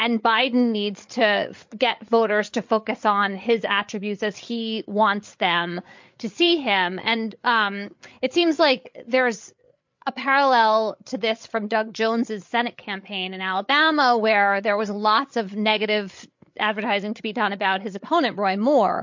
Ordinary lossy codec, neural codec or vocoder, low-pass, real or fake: MP3, 48 kbps; codec, 16 kHz, 8 kbps, FreqCodec, larger model; 7.2 kHz; fake